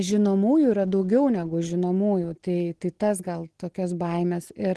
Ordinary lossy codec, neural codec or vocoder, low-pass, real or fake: Opus, 16 kbps; none; 10.8 kHz; real